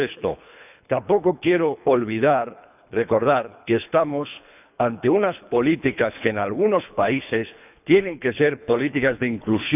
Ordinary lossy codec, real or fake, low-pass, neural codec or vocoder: none; fake; 3.6 kHz; codec, 24 kHz, 3 kbps, HILCodec